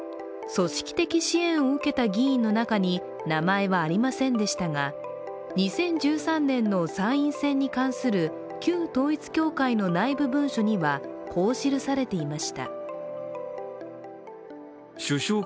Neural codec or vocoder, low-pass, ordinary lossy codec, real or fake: none; none; none; real